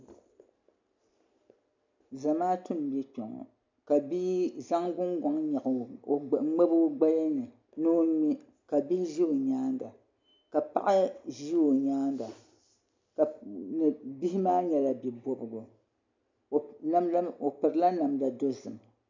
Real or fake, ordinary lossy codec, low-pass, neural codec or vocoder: real; MP3, 64 kbps; 7.2 kHz; none